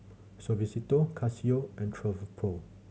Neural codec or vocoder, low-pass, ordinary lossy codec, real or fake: none; none; none; real